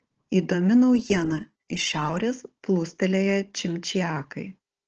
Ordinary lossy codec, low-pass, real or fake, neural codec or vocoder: Opus, 16 kbps; 7.2 kHz; fake; codec, 16 kHz, 16 kbps, FunCodec, trained on Chinese and English, 50 frames a second